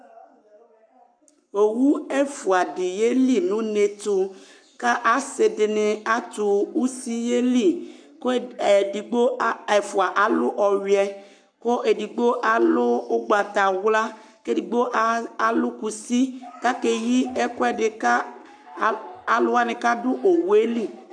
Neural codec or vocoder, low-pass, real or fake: codec, 44.1 kHz, 7.8 kbps, Pupu-Codec; 9.9 kHz; fake